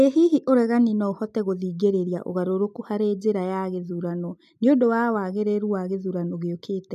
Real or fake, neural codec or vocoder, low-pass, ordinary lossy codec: real; none; 14.4 kHz; MP3, 96 kbps